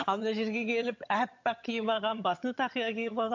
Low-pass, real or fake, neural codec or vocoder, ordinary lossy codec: 7.2 kHz; fake; vocoder, 22.05 kHz, 80 mel bands, HiFi-GAN; MP3, 48 kbps